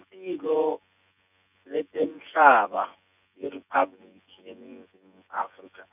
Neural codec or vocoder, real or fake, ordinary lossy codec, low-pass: vocoder, 24 kHz, 100 mel bands, Vocos; fake; none; 3.6 kHz